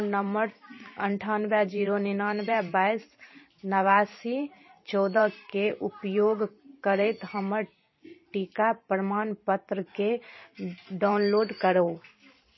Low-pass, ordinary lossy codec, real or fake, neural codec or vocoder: 7.2 kHz; MP3, 24 kbps; fake; vocoder, 44.1 kHz, 128 mel bands every 512 samples, BigVGAN v2